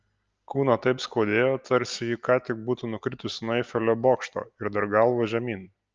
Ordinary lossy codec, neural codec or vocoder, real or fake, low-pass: Opus, 24 kbps; none; real; 7.2 kHz